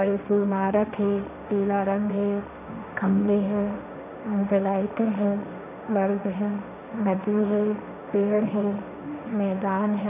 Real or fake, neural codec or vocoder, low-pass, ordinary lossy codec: fake; codec, 16 kHz, 1.1 kbps, Voila-Tokenizer; 3.6 kHz; none